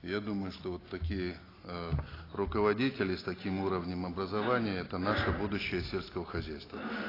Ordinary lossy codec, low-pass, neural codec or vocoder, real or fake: AAC, 24 kbps; 5.4 kHz; none; real